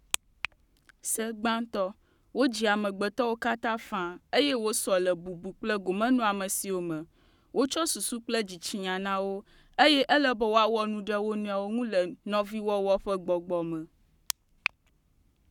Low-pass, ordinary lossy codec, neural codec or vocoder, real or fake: 19.8 kHz; none; codec, 44.1 kHz, 7.8 kbps, Pupu-Codec; fake